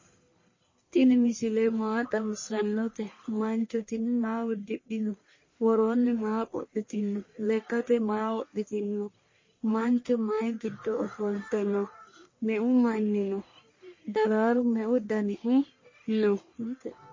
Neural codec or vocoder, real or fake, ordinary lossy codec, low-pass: codec, 44.1 kHz, 1.7 kbps, Pupu-Codec; fake; MP3, 32 kbps; 7.2 kHz